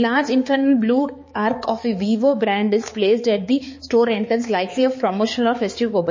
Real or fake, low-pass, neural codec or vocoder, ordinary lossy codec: fake; 7.2 kHz; codec, 16 kHz, 4 kbps, X-Codec, HuBERT features, trained on balanced general audio; MP3, 32 kbps